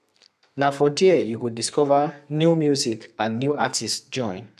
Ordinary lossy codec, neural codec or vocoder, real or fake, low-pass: none; codec, 32 kHz, 1.9 kbps, SNAC; fake; 14.4 kHz